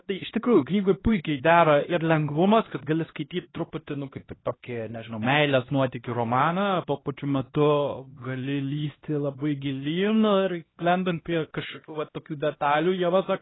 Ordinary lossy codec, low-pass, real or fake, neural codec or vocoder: AAC, 16 kbps; 7.2 kHz; fake; codec, 16 kHz, 1 kbps, X-Codec, HuBERT features, trained on LibriSpeech